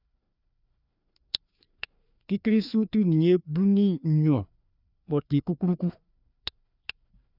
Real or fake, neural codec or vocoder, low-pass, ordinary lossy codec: fake; codec, 16 kHz, 2 kbps, FreqCodec, larger model; 5.4 kHz; none